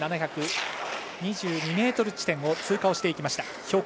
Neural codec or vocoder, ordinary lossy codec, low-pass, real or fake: none; none; none; real